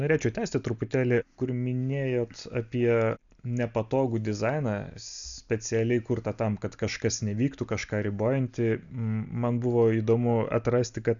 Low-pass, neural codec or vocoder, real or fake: 7.2 kHz; none; real